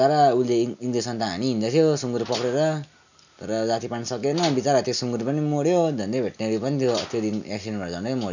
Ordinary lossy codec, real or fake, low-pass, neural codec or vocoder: none; real; 7.2 kHz; none